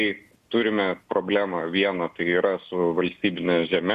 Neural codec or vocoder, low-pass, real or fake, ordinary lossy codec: none; 14.4 kHz; real; Opus, 64 kbps